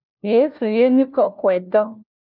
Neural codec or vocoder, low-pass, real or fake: codec, 16 kHz, 1 kbps, FunCodec, trained on LibriTTS, 50 frames a second; 5.4 kHz; fake